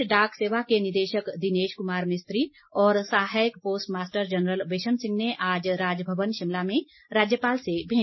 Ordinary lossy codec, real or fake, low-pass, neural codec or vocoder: MP3, 24 kbps; real; 7.2 kHz; none